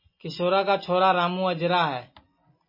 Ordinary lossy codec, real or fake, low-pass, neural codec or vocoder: MP3, 24 kbps; real; 5.4 kHz; none